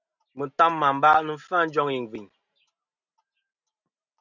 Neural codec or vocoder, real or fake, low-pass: none; real; 7.2 kHz